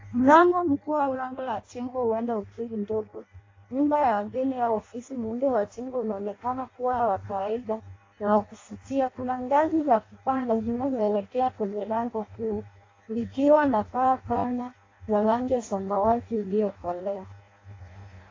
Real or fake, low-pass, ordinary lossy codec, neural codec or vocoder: fake; 7.2 kHz; AAC, 32 kbps; codec, 16 kHz in and 24 kHz out, 0.6 kbps, FireRedTTS-2 codec